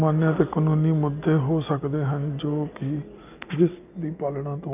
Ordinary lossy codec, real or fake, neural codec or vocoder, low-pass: none; real; none; 3.6 kHz